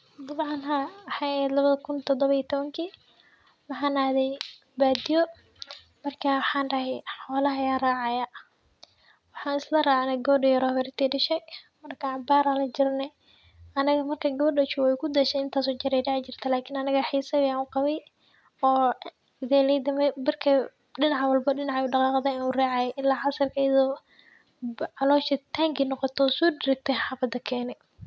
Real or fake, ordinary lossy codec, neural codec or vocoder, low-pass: real; none; none; none